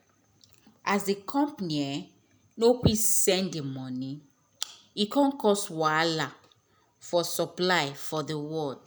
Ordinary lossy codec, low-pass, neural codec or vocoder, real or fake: none; none; none; real